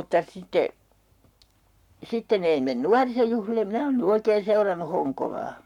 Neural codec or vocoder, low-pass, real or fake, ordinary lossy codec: codec, 44.1 kHz, 7.8 kbps, Pupu-Codec; 19.8 kHz; fake; none